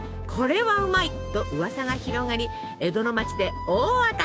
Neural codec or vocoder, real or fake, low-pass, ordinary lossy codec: codec, 16 kHz, 6 kbps, DAC; fake; none; none